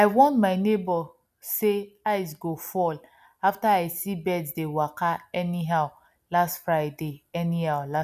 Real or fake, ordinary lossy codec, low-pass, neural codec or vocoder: real; none; 14.4 kHz; none